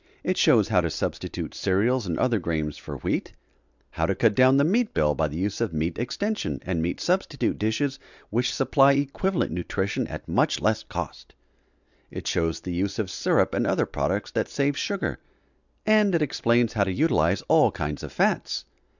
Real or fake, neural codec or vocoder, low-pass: real; none; 7.2 kHz